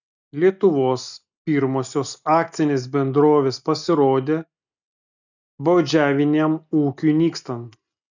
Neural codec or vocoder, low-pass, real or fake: none; 7.2 kHz; real